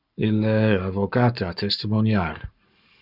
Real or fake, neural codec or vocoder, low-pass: fake; codec, 44.1 kHz, 7.8 kbps, Pupu-Codec; 5.4 kHz